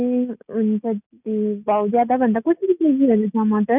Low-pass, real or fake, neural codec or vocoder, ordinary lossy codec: 3.6 kHz; real; none; none